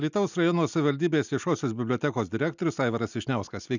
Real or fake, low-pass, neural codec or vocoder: real; 7.2 kHz; none